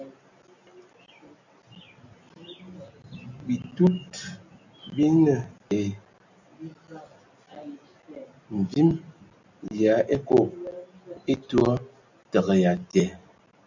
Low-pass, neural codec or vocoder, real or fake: 7.2 kHz; none; real